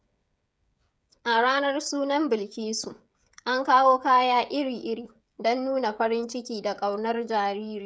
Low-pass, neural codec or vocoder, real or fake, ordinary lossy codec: none; codec, 16 kHz, 16 kbps, FreqCodec, smaller model; fake; none